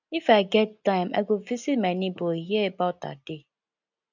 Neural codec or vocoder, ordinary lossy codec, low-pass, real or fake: none; none; 7.2 kHz; real